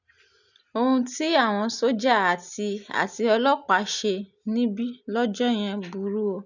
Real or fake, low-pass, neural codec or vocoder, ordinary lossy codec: real; 7.2 kHz; none; none